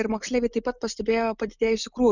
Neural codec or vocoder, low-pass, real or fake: none; 7.2 kHz; real